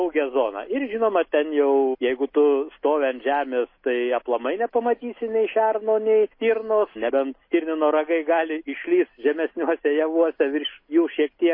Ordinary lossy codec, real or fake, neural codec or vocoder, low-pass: MP3, 24 kbps; real; none; 5.4 kHz